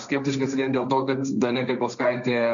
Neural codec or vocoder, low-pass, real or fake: codec, 16 kHz, 1.1 kbps, Voila-Tokenizer; 7.2 kHz; fake